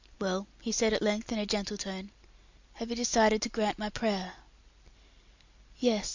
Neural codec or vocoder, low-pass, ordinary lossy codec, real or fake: none; 7.2 kHz; Opus, 64 kbps; real